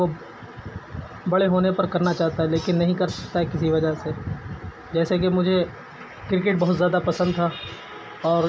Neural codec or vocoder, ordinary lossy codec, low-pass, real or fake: none; none; none; real